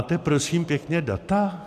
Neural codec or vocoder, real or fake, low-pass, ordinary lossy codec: none; real; 14.4 kHz; MP3, 96 kbps